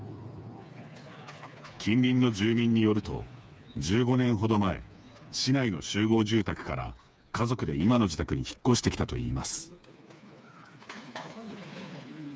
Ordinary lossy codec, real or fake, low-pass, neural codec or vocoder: none; fake; none; codec, 16 kHz, 4 kbps, FreqCodec, smaller model